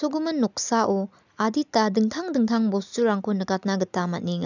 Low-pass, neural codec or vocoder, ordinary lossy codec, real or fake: 7.2 kHz; none; none; real